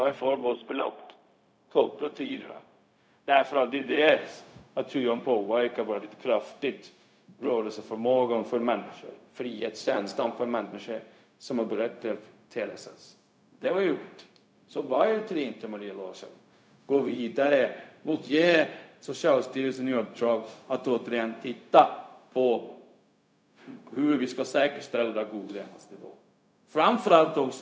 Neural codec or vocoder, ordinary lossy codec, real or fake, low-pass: codec, 16 kHz, 0.4 kbps, LongCat-Audio-Codec; none; fake; none